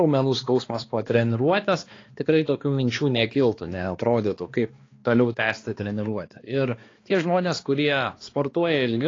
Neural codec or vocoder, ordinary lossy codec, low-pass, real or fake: codec, 16 kHz, 2 kbps, X-Codec, HuBERT features, trained on balanced general audio; AAC, 32 kbps; 7.2 kHz; fake